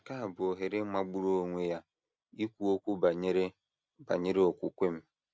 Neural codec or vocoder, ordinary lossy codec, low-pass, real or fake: none; none; none; real